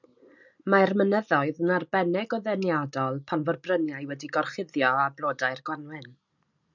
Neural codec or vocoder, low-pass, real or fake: none; 7.2 kHz; real